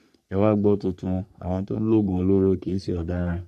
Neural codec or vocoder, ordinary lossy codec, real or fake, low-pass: codec, 44.1 kHz, 3.4 kbps, Pupu-Codec; none; fake; 14.4 kHz